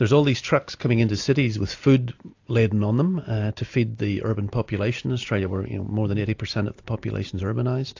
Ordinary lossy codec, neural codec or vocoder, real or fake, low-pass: AAC, 48 kbps; none; real; 7.2 kHz